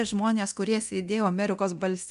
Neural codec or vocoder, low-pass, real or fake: codec, 24 kHz, 0.9 kbps, DualCodec; 10.8 kHz; fake